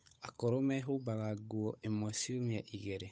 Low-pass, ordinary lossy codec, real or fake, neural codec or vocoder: none; none; fake; codec, 16 kHz, 8 kbps, FunCodec, trained on Chinese and English, 25 frames a second